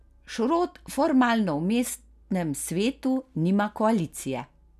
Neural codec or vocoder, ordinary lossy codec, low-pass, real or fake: none; none; 14.4 kHz; real